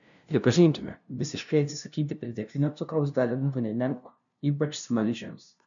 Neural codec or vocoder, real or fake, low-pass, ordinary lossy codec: codec, 16 kHz, 0.5 kbps, FunCodec, trained on LibriTTS, 25 frames a second; fake; 7.2 kHz; MP3, 96 kbps